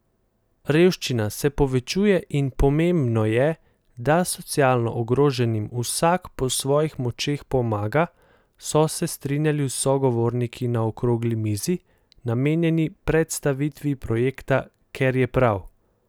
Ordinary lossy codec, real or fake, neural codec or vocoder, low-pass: none; real; none; none